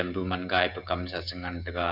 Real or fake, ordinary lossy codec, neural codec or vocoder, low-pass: fake; none; codec, 16 kHz, 16 kbps, FreqCodec, smaller model; 5.4 kHz